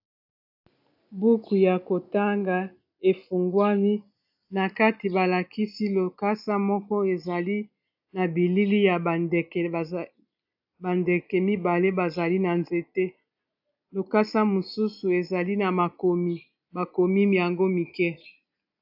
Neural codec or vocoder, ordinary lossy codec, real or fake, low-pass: none; AAC, 48 kbps; real; 5.4 kHz